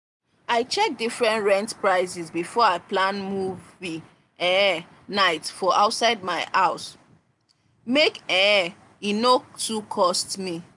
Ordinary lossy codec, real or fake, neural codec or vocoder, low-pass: none; real; none; 10.8 kHz